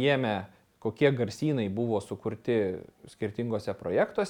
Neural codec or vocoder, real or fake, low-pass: none; real; 19.8 kHz